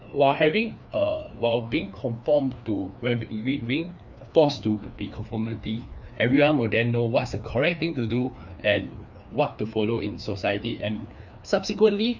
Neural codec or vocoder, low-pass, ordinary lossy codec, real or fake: codec, 16 kHz, 2 kbps, FreqCodec, larger model; 7.2 kHz; none; fake